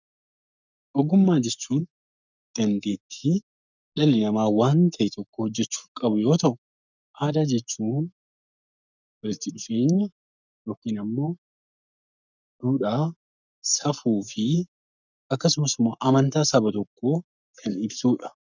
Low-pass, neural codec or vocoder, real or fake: 7.2 kHz; codec, 44.1 kHz, 7.8 kbps, Pupu-Codec; fake